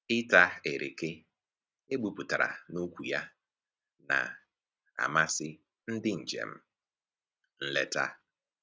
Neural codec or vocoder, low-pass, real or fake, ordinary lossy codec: none; none; real; none